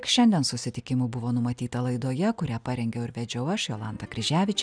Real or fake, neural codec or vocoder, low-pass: real; none; 9.9 kHz